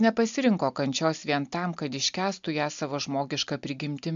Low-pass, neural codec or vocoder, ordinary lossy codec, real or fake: 7.2 kHz; none; MP3, 64 kbps; real